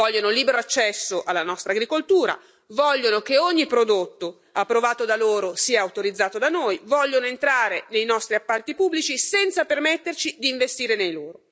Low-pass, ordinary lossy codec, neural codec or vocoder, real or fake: none; none; none; real